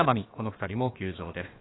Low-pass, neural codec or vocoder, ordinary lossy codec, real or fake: 7.2 kHz; codec, 16 kHz, about 1 kbps, DyCAST, with the encoder's durations; AAC, 16 kbps; fake